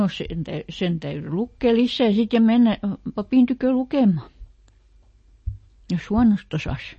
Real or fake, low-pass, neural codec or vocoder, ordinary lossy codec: real; 9.9 kHz; none; MP3, 32 kbps